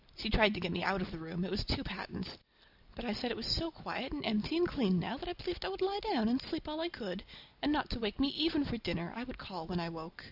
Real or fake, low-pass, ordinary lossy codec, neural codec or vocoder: real; 5.4 kHz; AAC, 48 kbps; none